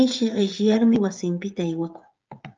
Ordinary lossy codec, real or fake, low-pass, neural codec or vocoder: Opus, 24 kbps; fake; 7.2 kHz; codec, 16 kHz, 16 kbps, FreqCodec, smaller model